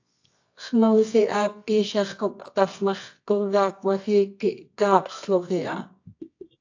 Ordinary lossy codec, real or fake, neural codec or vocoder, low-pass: AAC, 48 kbps; fake; codec, 24 kHz, 0.9 kbps, WavTokenizer, medium music audio release; 7.2 kHz